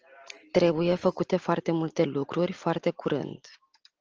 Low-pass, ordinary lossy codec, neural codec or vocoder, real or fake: 7.2 kHz; Opus, 24 kbps; none; real